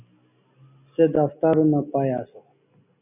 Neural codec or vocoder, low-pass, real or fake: none; 3.6 kHz; real